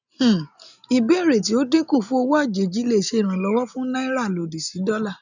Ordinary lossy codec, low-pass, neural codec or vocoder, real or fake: none; 7.2 kHz; none; real